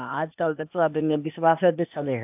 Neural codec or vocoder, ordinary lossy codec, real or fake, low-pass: codec, 16 kHz, 0.8 kbps, ZipCodec; AAC, 32 kbps; fake; 3.6 kHz